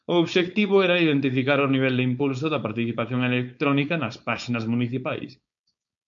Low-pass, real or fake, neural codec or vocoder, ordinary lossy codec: 7.2 kHz; fake; codec, 16 kHz, 4.8 kbps, FACodec; MP3, 64 kbps